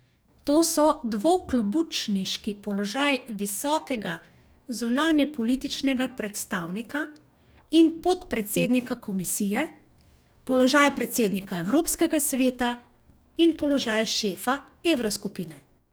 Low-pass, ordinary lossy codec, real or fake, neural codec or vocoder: none; none; fake; codec, 44.1 kHz, 2.6 kbps, DAC